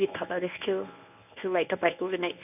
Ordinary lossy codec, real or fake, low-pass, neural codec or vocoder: none; fake; 3.6 kHz; codec, 24 kHz, 0.9 kbps, WavTokenizer, medium speech release version 1